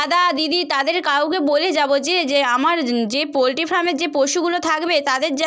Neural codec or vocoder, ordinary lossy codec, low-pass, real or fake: none; none; none; real